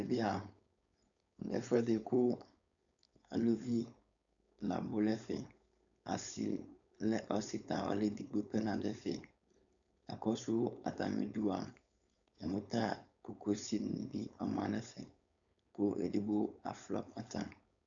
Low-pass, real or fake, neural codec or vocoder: 7.2 kHz; fake; codec, 16 kHz, 4.8 kbps, FACodec